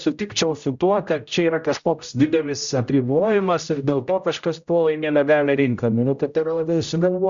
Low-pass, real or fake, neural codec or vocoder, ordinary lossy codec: 7.2 kHz; fake; codec, 16 kHz, 0.5 kbps, X-Codec, HuBERT features, trained on general audio; Opus, 64 kbps